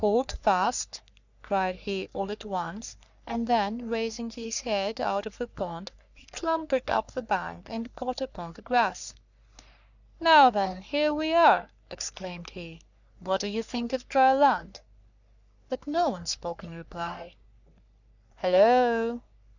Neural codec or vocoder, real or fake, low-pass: codec, 44.1 kHz, 3.4 kbps, Pupu-Codec; fake; 7.2 kHz